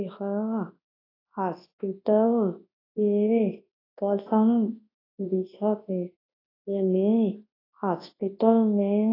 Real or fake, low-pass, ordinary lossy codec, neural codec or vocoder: fake; 5.4 kHz; AAC, 24 kbps; codec, 24 kHz, 0.9 kbps, WavTokenizer, large speech release